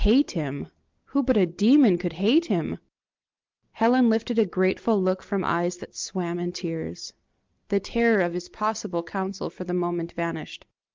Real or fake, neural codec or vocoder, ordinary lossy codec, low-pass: real; none; Opus, 32 kbps; 7.2 kHz